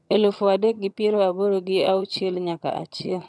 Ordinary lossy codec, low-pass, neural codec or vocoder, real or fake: none; none; vocoder, 22.05 kHz, 80 mel bands, HiFi-GAN; fake